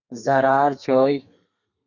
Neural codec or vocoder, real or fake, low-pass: codec, 32 kHz, 1.9 kbps, SNAC; fake; 7.2 kHz